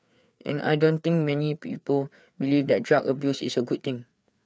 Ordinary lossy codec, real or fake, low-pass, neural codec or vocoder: none; fake; none; codec, 16 kHz, 4 kbps, FreqCodec, larger model